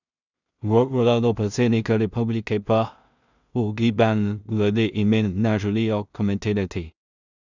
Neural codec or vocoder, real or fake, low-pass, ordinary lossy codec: codec, 16 kHz in and 24 kHz out, 0.4 kbps, LongCat-Audio-Codec, two codebook decoder; fake; 7.2 kHz; none